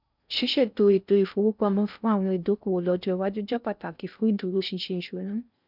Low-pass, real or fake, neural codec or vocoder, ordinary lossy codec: 5.4 kHz; fake; codec, 16 kHz in and 24 kHz out, 0.6 kbps, FocalCodec, streaming, 4096 codes; none